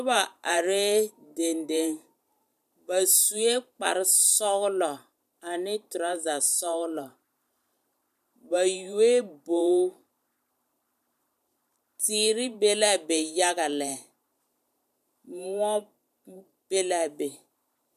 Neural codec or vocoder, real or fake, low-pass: vocoder, 44.1 kHz, 128 mel bands every 512 samples, BigVGAN v2; fake; 14.4 kHz